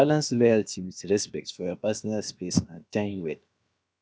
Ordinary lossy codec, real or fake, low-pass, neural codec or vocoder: none; fake; none; codec, 16 kHz, about 1 kbps, DyCAST, with the encoder's durations